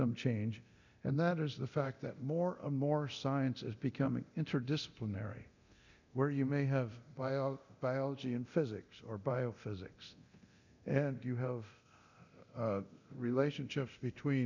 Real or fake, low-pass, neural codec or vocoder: fake; 7.2 kHz; codec, 24 kHz, 0.9 kbps, DualCodec